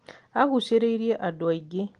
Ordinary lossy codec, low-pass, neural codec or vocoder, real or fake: Opus, 24 kbps; 9.9 kHz; none; real